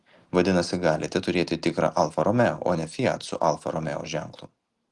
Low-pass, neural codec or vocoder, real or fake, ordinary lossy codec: 10.8 kHz; none; real; Opus, 24 kbps